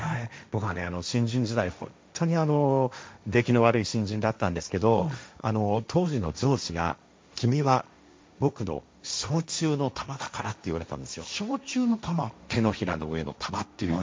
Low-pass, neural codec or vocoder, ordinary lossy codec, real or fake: none; codec, 16 kHz, 1.1 kbps, Voila-Tokenizer; none; fake